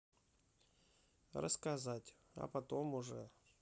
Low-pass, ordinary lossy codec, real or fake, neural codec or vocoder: none; none; real; none